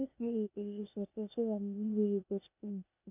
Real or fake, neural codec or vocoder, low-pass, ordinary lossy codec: fake; codec, 16 kHz, 0.8 kbps, ZipCodec; 3.6 kHz; none